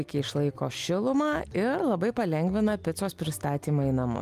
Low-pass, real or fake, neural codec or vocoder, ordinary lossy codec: 14.4 kHz; real; none; Opus, 32 kbps